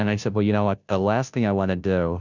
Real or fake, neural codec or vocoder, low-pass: fake; codec, 16 kHz, 0.5 kbps, FunCodec, trained on Chinese and English, 25 frames a second; 7.2 kHz